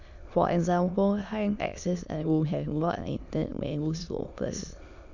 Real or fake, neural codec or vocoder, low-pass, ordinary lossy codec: fake; autoencoder, 22.05 kHz, a latent of 192 numbers a frame, VITS, trained on many speakers; 7.2 kHz; none